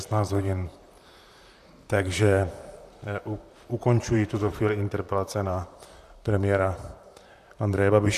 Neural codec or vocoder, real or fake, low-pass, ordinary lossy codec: vocoder, 44.1 kHz, 128 mel bands, Pupu-Vocoder; fake; 14.4 kHz; MP3, 96 kbps